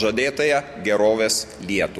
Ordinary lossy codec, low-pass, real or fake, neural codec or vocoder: MP3, 64 kbps; 14.4 kHz; real; none